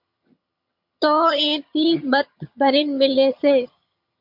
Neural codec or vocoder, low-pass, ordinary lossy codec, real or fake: vocoder, 22.05 kHz, 80 mel bands, HiFi-GAN; 5.4 kHz; MP3, 48 kbps; fake